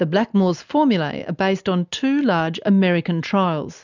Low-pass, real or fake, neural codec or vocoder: 7.2 kHz; real; none